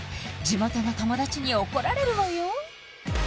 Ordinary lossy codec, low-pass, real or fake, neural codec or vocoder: none; none; real; none